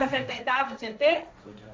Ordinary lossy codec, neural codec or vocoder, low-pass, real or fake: none; codec, 16 kHz, 1.1 kbps, Voila-Tokenizer; none; fake